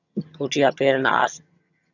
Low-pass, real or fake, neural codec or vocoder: 7.2 kHz; fake; vocoder, 22.05 kHz, 80 mel bands, HiFi-GAN